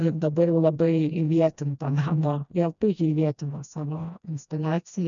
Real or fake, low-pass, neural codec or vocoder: fake; 7.2 kHz; codec, 16 kHz, 1 kbps, FreqCodec, smaller model